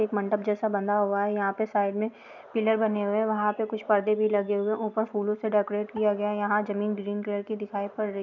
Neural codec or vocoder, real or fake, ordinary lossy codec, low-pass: none; real; none; 7.2 kHz